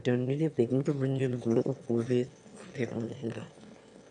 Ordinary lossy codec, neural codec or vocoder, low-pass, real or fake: none; autoencoder, 22.05 kHz, a latent of 192 numbers a frame, VITS, trained on one speaker; 9.9 kHz; fake